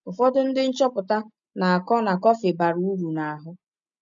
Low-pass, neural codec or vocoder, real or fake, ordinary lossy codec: 7.2 kHz; none; real; none